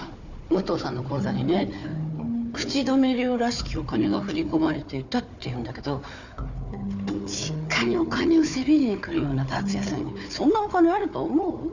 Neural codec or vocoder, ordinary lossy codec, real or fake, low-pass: codec, 16 kHz, 4 kbps, FunCodec, trained on Chinese and English, 50 frames a second; none; fake; 7.2 kHz